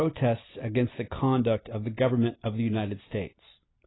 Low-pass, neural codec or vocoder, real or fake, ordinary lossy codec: 7.2 kHz; none; real; AAC, 16 kbps